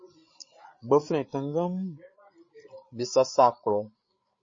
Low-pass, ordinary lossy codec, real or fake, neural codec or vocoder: 7.2 kHz; MP3, 32 kbps; fake; codec, 16 kHz, 8 kbps, FreqCodec, larger model